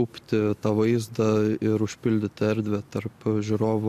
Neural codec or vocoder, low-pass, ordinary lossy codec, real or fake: none; 14.4 kHz; MP3, 64 kbps; real